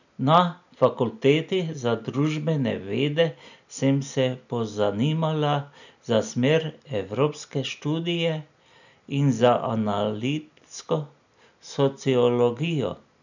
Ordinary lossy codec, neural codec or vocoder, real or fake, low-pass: none; none; real; 7.2 kHz